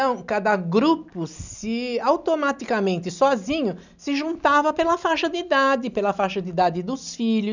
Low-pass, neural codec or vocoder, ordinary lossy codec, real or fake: 7.2 kHz; none; none; real